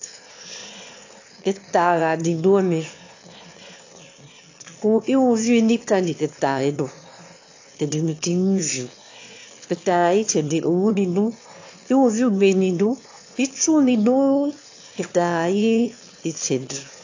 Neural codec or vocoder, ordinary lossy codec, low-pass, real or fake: autoencoder, 22.05 kHz, a latent of 192 numbers a frame, VITS, trained on one speaker; AAC, 48 kbps; 7.2 kHz; fake